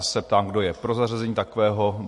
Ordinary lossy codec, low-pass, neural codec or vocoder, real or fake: MP3, 48 kbps; 10.8 kHz; none; real